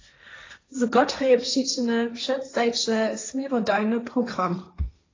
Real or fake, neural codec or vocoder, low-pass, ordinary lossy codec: fake; codec, 16 kHz, 1.1 kbps, Voila-Tokenizer; 7.2 kHz; AAC, 32 kbps